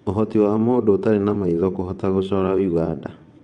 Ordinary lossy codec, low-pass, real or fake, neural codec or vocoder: none; 9.9 kHz; fake; vocoder, 22.05 kHz, 80 mel bands, WaveNeXt